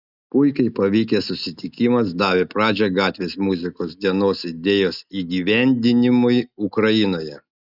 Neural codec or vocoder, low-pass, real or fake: none; 5.4 kHz; real